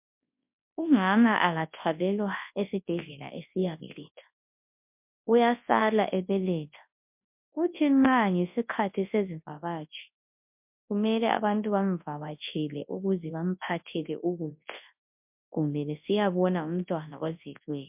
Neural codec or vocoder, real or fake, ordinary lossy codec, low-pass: codec, 24 kHz, 0.9 kbps, WavTokenizer, large speech release; fake; MP3, 32 kbps; 3.6 kHz